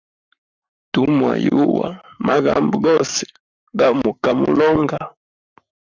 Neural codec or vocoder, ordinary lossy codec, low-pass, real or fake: codec, 44.1 kHz, 7.8 kbps, Pupu-Codec; Opus, 64 kbps; 7.2 kHz; fake